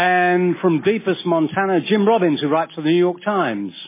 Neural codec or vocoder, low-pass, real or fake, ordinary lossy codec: none; 3.6 kHz; real; MP3, 16 kbps